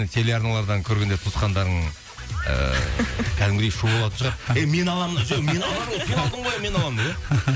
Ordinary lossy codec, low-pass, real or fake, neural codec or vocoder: none; none; real; none